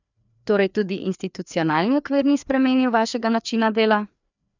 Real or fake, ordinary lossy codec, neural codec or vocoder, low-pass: fake; none; codec, 16 kHz, 2 kbps, FreqCodec, larger model; 7.2 kHz